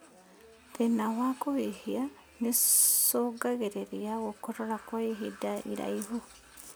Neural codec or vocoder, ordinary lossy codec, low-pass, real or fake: none; none; none; real